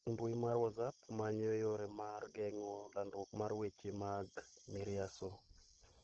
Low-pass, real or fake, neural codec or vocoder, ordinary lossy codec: 7.2 kHz; fake; codec, 16 kHz, 16 kbps, FunCodec, trained on Chinese and English, 50 frames a second; Opus, 16 kbps